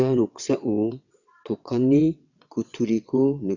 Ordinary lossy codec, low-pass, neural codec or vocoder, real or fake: none; 7.2 kHz; vocoder, 22.05 kHz, 80 mel bands, WaveNeXt; fake